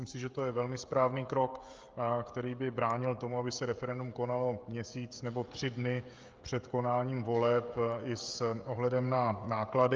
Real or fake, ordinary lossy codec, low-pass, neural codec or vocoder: fake; Opus, 24 kbps; 7.2 kHz; codec, 16 kHz, 16 kbps, FreqCodec, smaller model